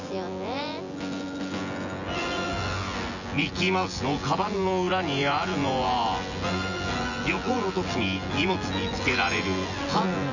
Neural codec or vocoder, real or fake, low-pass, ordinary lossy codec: vocoder, 24 kHz, 100 mel bands, Vocos; fake; 7.2 kHz; none